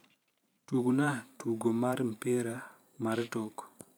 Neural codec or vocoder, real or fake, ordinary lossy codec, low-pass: vocoder, 44.1 kHz, 128 mel bands every 512 samples, BigVGAN v2; fake; none; none